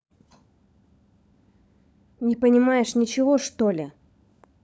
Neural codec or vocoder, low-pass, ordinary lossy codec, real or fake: codec, 16 kHz, 16 kbps, FunCodec, trained on LibriTTS, 50 frames a second; none; none; fake